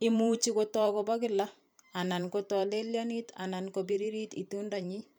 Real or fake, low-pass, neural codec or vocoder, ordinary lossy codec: fake; none; vocoder, 44.1 kHz, 128 mel bands every 512 samples, BigVGAN v2; none